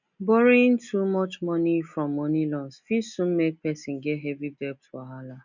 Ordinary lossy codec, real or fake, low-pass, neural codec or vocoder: none; real; 7.2 kHz; none